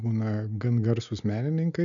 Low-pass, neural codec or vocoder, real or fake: 7.2 kHz; none; real